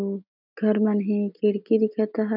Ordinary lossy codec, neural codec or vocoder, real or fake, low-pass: none; none; real; 5.4 kHz